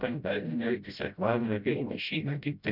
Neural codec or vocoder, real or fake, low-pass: codec, 16 kHz, 0.5 kbps, FreqCodec, smaller model; fake; 5.4 kHz